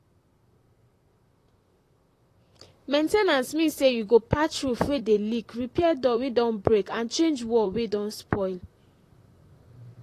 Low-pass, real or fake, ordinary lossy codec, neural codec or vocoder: 14.4 kHz; fake; AAC, 48 kbps; vocoder, 44.1 kHz, 128 mel bands, Pupu-Vocoder